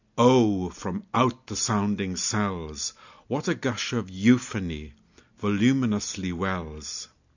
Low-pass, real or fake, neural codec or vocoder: 7.2 kHz; real; none